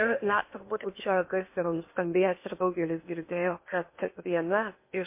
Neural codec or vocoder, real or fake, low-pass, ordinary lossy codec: codec, 16 kHz in and 24 kHz out, 0.8 kbps, FocalCodec, streaming, 65536 codes; fake; 3.6 kHz; MP3, 24 kbps